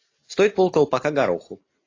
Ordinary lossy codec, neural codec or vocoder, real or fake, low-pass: AAC, 32 kbps; none; real; 7.2 kHz